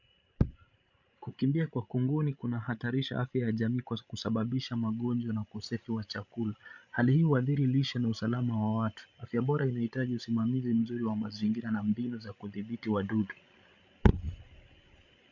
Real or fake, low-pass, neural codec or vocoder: fake; 7.2 kHz; codec, 16 kHz, 16 kbps, FreqCodec, larger model